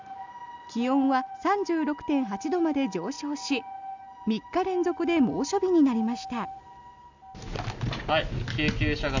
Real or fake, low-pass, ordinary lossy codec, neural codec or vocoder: real; 7.2 kHz; none; none